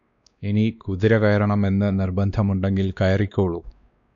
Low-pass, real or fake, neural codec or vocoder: 7.2 kHz; fake; codec, 16 kHz, 2 kbps, X-Codec, WavLM features, trained on Multilingual LibriSpeech